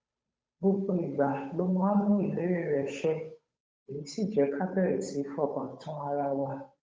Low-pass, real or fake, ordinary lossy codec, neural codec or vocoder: none; fake; none; codec, 16 kHz, 8 kbps, FunCodec, trained on Chinese and English, 25 frames a second